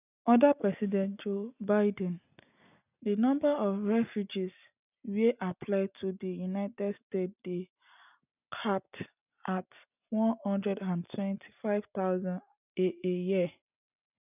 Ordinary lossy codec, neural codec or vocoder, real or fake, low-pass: none; none; real; 3.6 kHz